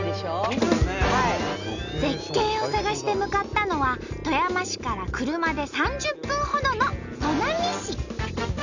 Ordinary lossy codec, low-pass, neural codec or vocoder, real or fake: none; 7.2 kHz; none; real